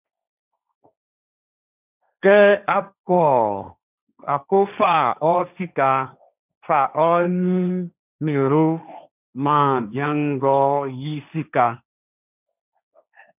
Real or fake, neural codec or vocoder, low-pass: fake; codec, 16 kHz, 1.1 kbps, Voila-Tokenizer; 3.6 kHz